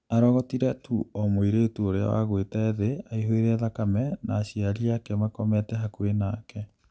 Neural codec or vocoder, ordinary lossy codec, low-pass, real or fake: none; none; none; real